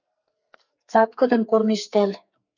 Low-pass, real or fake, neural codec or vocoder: 7.2 kHz; fake; codec, 44.1 kHz, 2.6 kbps, SNAC